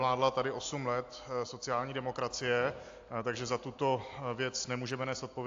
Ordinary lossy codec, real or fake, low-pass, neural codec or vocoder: AAC, 48 kbps; real; 7.2 kHz; none